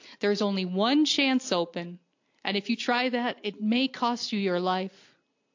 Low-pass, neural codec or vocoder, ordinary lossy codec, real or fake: 7.2 kHz; none; AAC, 48 kbps; real